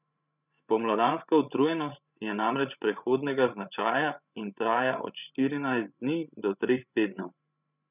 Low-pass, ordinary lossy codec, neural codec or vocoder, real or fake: 3.6 kHz; none; codec, 16 kHz, 16 kbps, FreqCodec, larger model; fake